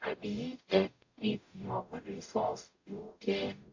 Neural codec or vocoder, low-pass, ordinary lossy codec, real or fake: codec, 44.1 kHz, 0.9 kbps, DAC; 7.2 kHz; AAC, 48 kbps; fake